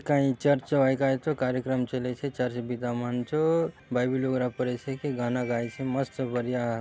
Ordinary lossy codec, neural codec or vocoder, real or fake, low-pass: none; none; real; none